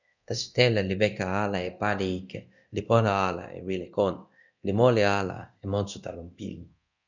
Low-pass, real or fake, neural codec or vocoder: 7.2 kHz; fake; codec, 24 kHz, 0.9 kbps, DualCodec